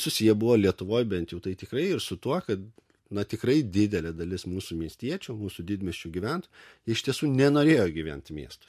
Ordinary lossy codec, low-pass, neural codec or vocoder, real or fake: MP3, 64 kbps; 14.4 kHz; none; real